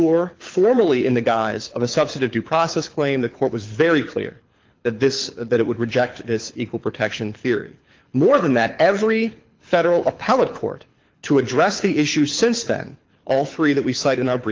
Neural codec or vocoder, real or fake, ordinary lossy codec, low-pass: codec, 24 kHz, 6 kbps, HILCodec; fake; Opus, 24 kbps; 7.2 kHz